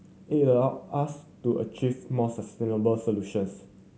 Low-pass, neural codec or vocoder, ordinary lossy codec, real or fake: none; none; none; real